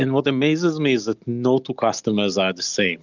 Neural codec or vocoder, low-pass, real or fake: none; 7.2 kHz; real